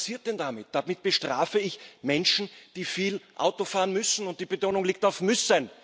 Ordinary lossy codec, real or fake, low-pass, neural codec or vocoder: none; real; none; none